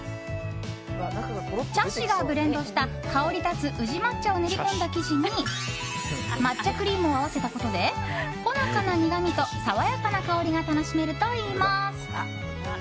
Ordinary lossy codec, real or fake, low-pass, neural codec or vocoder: none; real; none; none